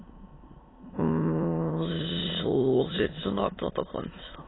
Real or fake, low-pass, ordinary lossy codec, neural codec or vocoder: fake; 7.2 kHz; AAC, 16 kbps; autoencoder, 22.05 kHz, a latent of 192 numbers a frame, VITS, trained on many speakers